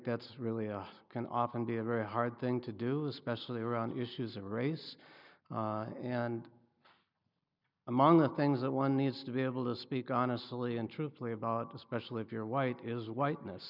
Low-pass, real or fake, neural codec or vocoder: 5.4 kHz; real; none